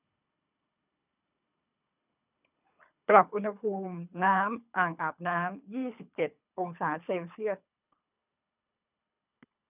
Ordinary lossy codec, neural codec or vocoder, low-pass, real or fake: none; codec, 24 kHz, 3 kbps, HILCodec; 3.6 kHz; fake